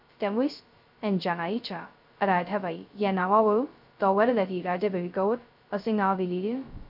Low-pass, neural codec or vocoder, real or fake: 5.4 kHz; codec, 16 kHz, 0.2 kbps, FocalCodec; fake